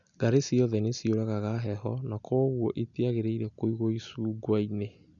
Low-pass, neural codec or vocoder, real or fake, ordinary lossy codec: 7.2 kHz; none; real; none